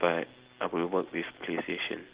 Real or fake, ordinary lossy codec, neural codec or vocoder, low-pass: real; Opus, 16 kbps; none; 3.6 kHz